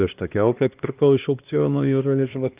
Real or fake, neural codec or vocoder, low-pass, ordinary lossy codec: fake; codec, 16 kHz, 1 kbps, X-Codec, HuBERT features, trained on LibriSpeech; 3.6 kHz; Opus, 24 kbps